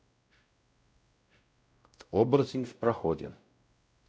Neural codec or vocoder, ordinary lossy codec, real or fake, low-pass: codec, 16 kHz, 0.5 kbps, X-Codec, WavLM features, trained on Multilingual LibriSpeech; none; fake; none